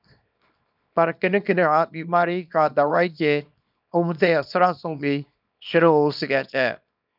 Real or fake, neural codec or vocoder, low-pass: fake; codec, 24 kHz, 0.9 kbps, WavTokenizer, small release; 5.4 kHz